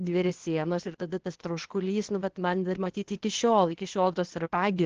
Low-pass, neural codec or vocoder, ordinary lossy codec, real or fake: 7.2 kHz; codec, 16 kHz, 0.8 kbps, ZipCodec; Opus, 16 kbps; fake